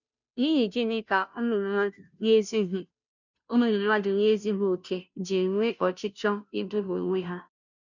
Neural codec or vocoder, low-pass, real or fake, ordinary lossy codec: codec, 16 kHz, 0.5 kbps, FunCodec, trained on Chinese and English, 25 frames a second; 7.2 kHz; fake; none